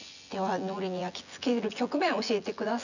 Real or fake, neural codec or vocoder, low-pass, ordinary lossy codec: fake; vocoder, 24 kHz, 100 mel bands, Vocos; 7.2 kHz; none